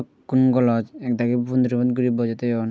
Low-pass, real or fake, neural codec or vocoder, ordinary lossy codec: none; real; none; none